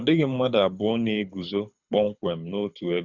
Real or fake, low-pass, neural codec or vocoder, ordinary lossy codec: fake; 7.2 kHz; codec, 24 kHz, 6 kbps, HILCodec; Opus, 64 kbps